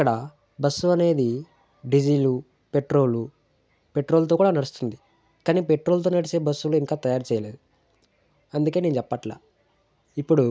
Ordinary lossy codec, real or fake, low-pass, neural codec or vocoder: none; real; none; none